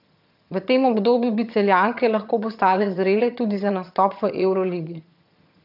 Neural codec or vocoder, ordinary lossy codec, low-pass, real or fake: vocoder, 22.05 kHz, 80 mel bands, HiFi-GAN; none; 5.4 kHz; fake